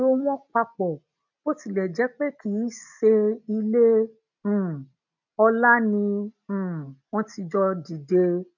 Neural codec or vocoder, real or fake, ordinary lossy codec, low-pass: none; real; none; 7.2 kHz